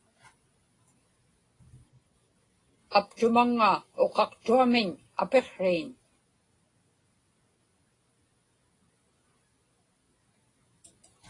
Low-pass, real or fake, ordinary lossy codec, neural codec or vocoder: 10.8 kHz; real; AAC, 32 kbps; none